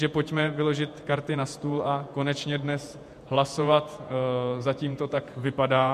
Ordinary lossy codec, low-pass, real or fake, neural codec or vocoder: MP3, 64 kbps; 14.4 kHz; fake; vocoder, 48 kHz, 128 mel bands, Vocos